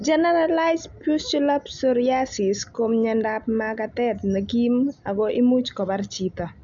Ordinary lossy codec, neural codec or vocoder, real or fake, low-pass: none; none; real; 7.2 kHz